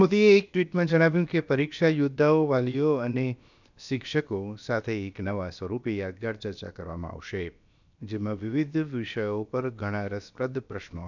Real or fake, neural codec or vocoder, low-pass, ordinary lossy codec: fake; codec, 16 kHz, about 1 kbps, DyCAST, with the encoder's durations; 7.2 kHz; none